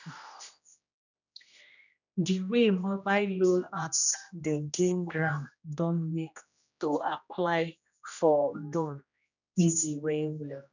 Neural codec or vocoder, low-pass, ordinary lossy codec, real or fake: codec, 16 kHz, 1 kbps, X-Codec, HuBERT features, trained on general audio; 7.2 kHz; none; fake